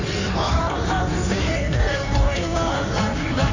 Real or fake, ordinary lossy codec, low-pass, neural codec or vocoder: fake; Opus, 64 kbps; 7.2 kHz; codec, 16 kHz in and 24 kHz out, 1.1 kbps, FireRedTTS-2 codec